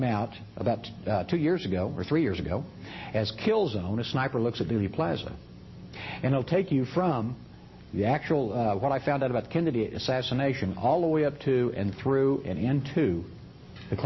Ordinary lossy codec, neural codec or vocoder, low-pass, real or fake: MP3, 24 kbps; none; 7.2 kHz; real